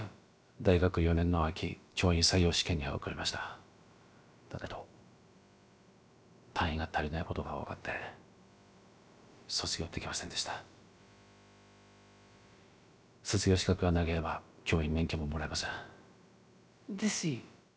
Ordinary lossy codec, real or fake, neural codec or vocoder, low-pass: none; fake; codec, 16 kHz, about 1 kbps, DyCAST, with the encoder's durations; none